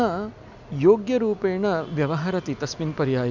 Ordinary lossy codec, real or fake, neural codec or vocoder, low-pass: none; real; none; 7.2 kHz